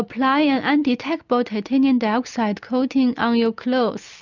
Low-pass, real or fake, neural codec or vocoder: 7.2 kHz; real; none